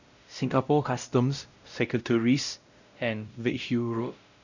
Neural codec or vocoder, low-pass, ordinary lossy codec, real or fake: codec, 16 kHz, 0.5 kbps, X-Codec, WavLM features, trained on Multilingual LibriSpeech; 7.2 kHz; none; fake